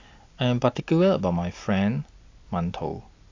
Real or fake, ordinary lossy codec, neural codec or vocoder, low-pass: real; AAC, 48 kbps; none; 7.2 kHz